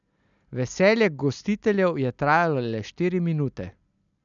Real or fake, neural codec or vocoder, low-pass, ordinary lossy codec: real; none; 7.2 kHz; none